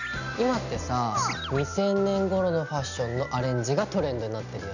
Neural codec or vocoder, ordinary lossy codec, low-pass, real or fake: none; none; 7.2 kHz; real